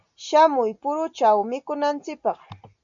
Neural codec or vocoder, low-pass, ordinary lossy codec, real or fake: none; 7.2 kHz; MP3, 96 kbps; real